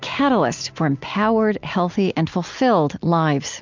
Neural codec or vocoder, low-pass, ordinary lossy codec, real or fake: none; 7.2 kHz; MP3, 64 kbps; real